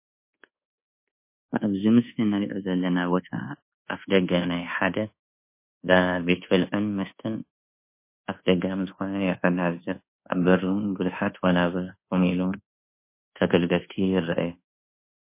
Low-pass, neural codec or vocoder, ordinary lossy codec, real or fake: 3.6 kHz; codec, 24 kHz, 1.2 kbps, DualCodec; MP3, 24 kbps; fake